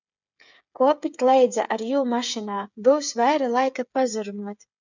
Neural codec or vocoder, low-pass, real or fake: codec, 16 kHz, 8 kbps, FreqCodec, smaller model; 7.2 kHz; fake